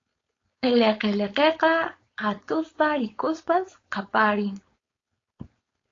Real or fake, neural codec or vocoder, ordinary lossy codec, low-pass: fake; codec, 16 kHz, 4.8 kbps, FACodec; AAC, 32 kbps; 7.2 kHz